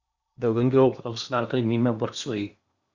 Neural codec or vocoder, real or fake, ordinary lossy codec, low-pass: codec, 16 kHz in and 24 kHz out, 0.8 kbps, FocalCodec, streaming, 65536 codes; fake; Opus, 64 kbps; 7.2 kHz